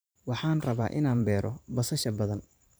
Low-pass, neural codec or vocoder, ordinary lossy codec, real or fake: none; vocoder, 44.1 kHz, 128 mel bands every 512 samples, BigVGAN v2; none; fake